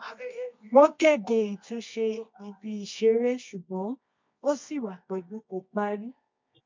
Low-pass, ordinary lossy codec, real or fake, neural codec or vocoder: 7.2 kHz; MP3, 48 kbps; fake; codec, 24 kHz, 0.9 kbps, WavTokenizer, medium music audio release